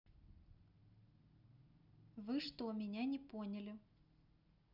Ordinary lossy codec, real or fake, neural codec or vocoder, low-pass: none; real; none; 5.4 kHz